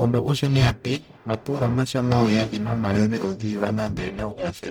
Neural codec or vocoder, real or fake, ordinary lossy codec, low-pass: codec, 44.1 kHz, 0.9 kbps, DAC; fake; none; none